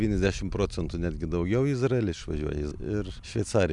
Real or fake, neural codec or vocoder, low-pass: real; none; 10.8 kHz